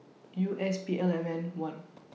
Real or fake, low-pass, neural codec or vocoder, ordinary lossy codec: real; none; none; none